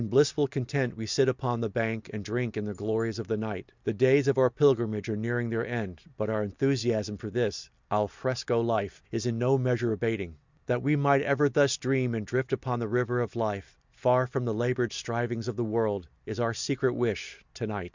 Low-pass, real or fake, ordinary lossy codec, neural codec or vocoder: 7.2 kHz; real; Opus, 64 kbps; none